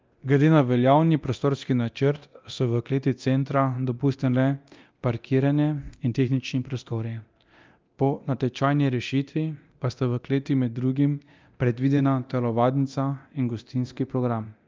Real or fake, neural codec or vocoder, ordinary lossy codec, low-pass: fake; codec, 24 kHz, 0.9 kbps, DualCodec; Opus, 24 kbps; 7.2 kHz